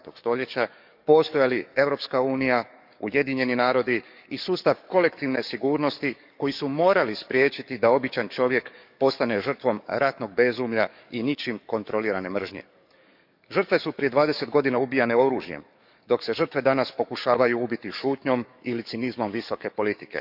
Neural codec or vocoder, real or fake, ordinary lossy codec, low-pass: codec, 44.1 kHz, 7.8 kbps, DAC; fake; none; 5.4 kHz